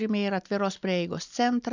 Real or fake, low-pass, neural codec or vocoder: real; 7.2 kHz; none